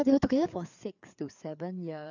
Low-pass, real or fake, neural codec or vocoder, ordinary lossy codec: 7.2 kHz; fake; codec, 16 kHz, 4 kbps, FreqCodec, larger model; none